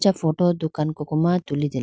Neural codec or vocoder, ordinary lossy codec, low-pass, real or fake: none; none; none; real